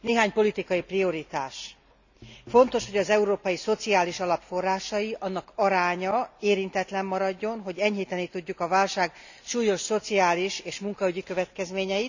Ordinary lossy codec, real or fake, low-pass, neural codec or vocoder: none; real; 7.2 kHz; none